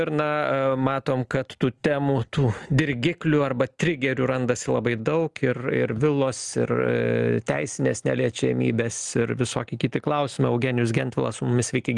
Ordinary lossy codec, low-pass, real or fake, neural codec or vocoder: Opus, 24 kbps; 10.8 kHz; real; none